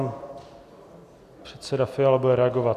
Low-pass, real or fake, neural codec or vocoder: 14.4 kHz; real; none